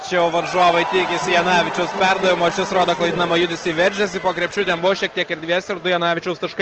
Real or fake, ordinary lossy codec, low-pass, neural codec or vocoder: real; Opus, 16 kbps; 7.2 kHz; none